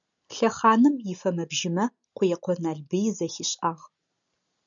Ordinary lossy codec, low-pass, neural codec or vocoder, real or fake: MP3, 96 kbps; 7.2 kHz; none; real